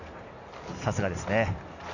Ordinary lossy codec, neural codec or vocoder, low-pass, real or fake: none; none; 7.2 kHz; real